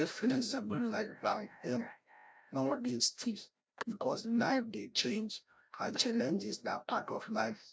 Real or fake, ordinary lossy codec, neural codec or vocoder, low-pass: fake; none; codec, 16 kHz, 0.5 kbps, FreqCodec, larger model; none